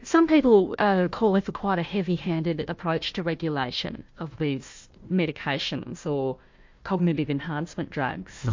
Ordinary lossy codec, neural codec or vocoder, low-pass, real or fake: MP3, 48 kbps; codec, 16 kHz, 1 kbps, FunCodec, trained on Chinese and English, 50 frames a second; 7.2 kHz; fake